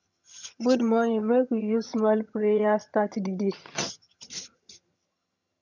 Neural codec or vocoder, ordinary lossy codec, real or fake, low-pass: vocoder, 22.05 kHz, 80 mel bands, HiFi-GAN; none; fake; 7.2 kHz